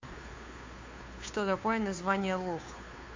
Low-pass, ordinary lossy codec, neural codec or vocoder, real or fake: 7.2 kHz; MP3, 48 kbps; codec, 16 kHz, 2 kbps, FunCodec, trained on Chinese and English, 25 frames a second; fake